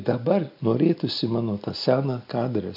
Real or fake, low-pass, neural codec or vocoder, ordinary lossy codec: real; 5.4 kHz; none; MP3, 48 kbps